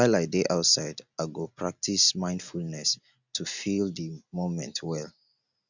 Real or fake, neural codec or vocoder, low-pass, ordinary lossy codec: real; none; 7.2 kHz; none